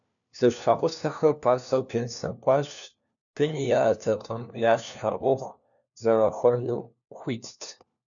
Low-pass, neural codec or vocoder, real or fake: 7.2 kHz; codec, 16 kHz, 1 kbps, FunCodec, trained on LibriTTS, 50 frames a second; fake